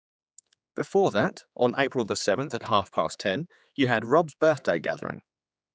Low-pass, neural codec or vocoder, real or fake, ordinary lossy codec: none; codec, 16 kHz, 4 kbps, X-Codec, HuBERT features, trained on general audio; fake; none